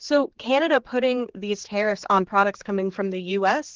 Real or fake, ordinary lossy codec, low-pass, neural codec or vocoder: fake; Opus, 16 kbps; 7.2 kHz; codec, 16 kHz, 4 kbps, X-Codec, HuBERT features, trained on general audio